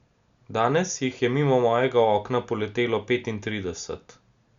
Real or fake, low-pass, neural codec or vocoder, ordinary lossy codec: real; 7.2 kHz; none; Opus, 64 kbps